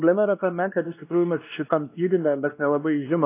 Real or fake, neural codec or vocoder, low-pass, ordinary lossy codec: fake; codec, 16 kHz, 1 kbps, X-Codec, WavLM features, trained on Multilingual LibriSpeech; 3.6 kHz; AAC, 24 kbps